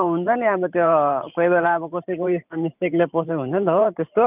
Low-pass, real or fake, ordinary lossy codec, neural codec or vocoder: 3.6 kHz; fake; none; vocoder, 44.1 kHz, 128 mel bands every 512 samples, BigVGAN v2